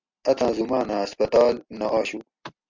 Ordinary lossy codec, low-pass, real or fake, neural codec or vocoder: MP3, 64 kbps; 7.2 kHz; real; none